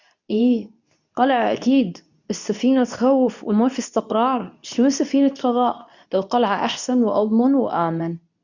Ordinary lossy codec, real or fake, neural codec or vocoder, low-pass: none; fake; codec, 24 kHz, 0.9 kbps, WavTokenizer, medium speech release version 2; 7.2 kHz